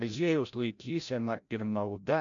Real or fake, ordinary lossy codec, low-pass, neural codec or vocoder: fake; AAC, 64 kbps; 7.2 kHz; codec, 16 kHz, 0.5 kbps, FreqCodec, larger model